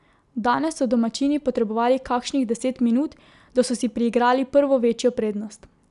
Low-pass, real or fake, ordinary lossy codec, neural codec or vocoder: 10.8 kHz; real; none; none